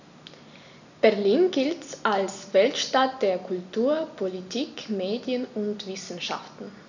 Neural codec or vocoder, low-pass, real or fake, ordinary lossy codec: none; 7.2 kHz; real; none